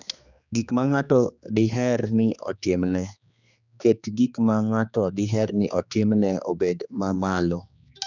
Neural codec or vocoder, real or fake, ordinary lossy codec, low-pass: codec, 16 kHz, 2 kbps, X-Codec, HuBERT features, trained on general audio; fake; none; 7.2 kHz